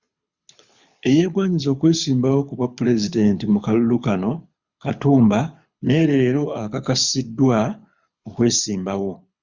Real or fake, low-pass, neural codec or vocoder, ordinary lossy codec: fake; 7.2 kHz; codec, 24 kHz, 6 kbps, HILCodec; Opus, 64 kbps